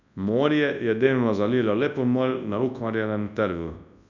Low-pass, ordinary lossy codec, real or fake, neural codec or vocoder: 7.2 kHz; none; fake; codec, 24 kHz, 0.9 kbps, WavTokenizer, large speech release